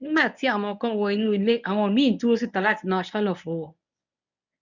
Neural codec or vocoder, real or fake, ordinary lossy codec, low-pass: codec, 24 kHz, 0.9 kbps, WavTokenizer, medium speech release version 1; fake; none; 7.2 kHz